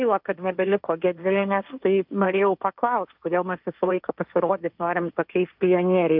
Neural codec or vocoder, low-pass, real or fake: codec, 16 kHz, 1.1 kbps, Voila-Tokenizer; 5.4 kHz; fake